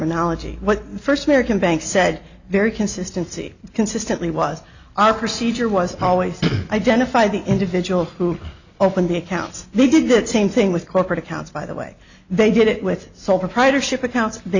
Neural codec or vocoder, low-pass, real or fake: none; 7.2 kHz; real